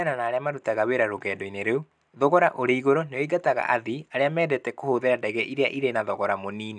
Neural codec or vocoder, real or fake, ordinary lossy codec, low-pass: none; real; none; 9.9 kHz